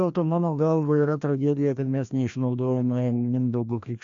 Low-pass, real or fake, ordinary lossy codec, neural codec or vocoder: 7.2 kHz; fake; AAC, 64 kbps; codec, 16 kHz, 1 kbps, FreqCodec, larger model